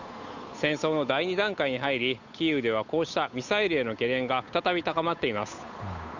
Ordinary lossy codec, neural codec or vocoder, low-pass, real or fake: none; codec, 16 kHz, 8 kbps, FunCodec, trained on Chinese and English, 25 frames a second; 7.2 kHz; fake